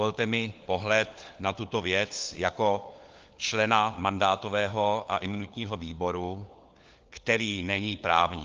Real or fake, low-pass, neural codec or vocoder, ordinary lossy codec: fake; 7.2 kHz; codec, 16 kHz, 4 kbps, FunCodec, trained on LibriTTS, 50 frames a second; Opus, 24 kbps